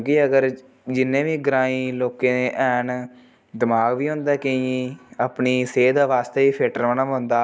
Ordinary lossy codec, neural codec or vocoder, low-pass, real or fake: none; none; none; real